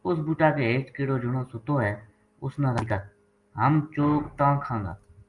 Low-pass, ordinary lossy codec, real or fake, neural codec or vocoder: 10.8 kHz; Opus, 32 kbps; real; none